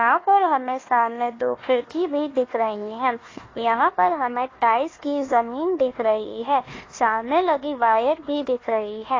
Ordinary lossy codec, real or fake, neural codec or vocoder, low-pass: AAC, 32 kbps; fake; codec, 16 kHz, 1 kbps, FunCodec, trained on Chinese and English, 50 frames a second; 7.2 kHz